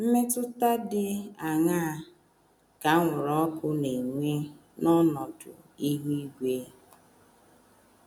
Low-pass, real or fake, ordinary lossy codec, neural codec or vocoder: none; real; none; none